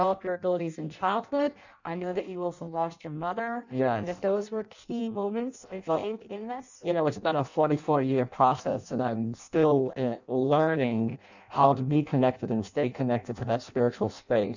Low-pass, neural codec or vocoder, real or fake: 7.2 kHz; codec, 16 kHz in and 24 kHz out, 0.6 kbps, FireRedTTS-2 codec; fake